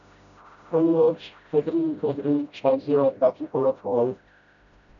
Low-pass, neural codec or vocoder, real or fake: 7.2 kHz; codec, 16 kHz, 0.5 kbps, FreqCodec, smaller model; fake